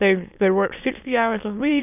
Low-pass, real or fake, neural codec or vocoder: 3.6 kHz; fake; autoencoder, 22.05 kHz, a latent of 192 numbers a frame, VITS, trained on many speakers